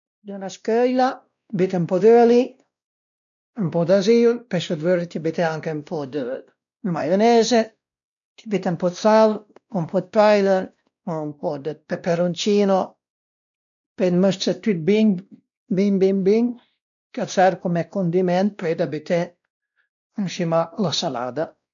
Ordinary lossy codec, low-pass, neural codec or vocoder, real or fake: none; 7.2 kHz; codec, 16 kHz, 1 kbps, X-Codec, WavLM features, trained on Multilingual LibriSpeech; fake